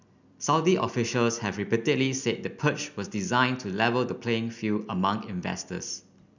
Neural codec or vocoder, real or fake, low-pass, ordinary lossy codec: none; real; 7.2 kHz; none